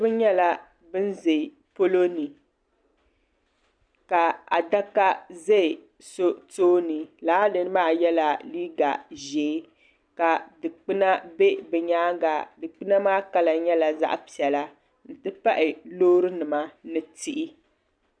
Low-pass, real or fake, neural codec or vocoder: 9.9 kHz; real; none